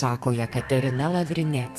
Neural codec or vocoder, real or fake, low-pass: codec, 32 kHz, 1.9 kbps, SNAC; fake; 14.4 kHz